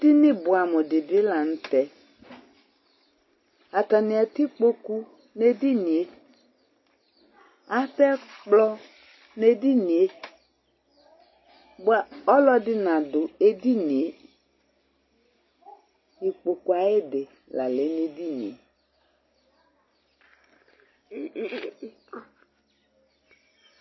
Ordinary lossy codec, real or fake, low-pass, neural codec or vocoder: MP3, 24 kbps; real; 7.2 kHz; none